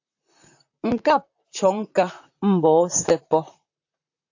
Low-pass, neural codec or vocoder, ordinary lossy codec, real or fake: 7.2 kHz; vocoder, 44.1 kHz, 128 mel bands, Pupu-Vocoder; AAC, 48 kbps; fake